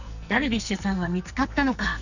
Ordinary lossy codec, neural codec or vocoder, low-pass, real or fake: none; codec, 44.1 kHz, 2.6 kbps, SNAC; 7.2 kHz; fake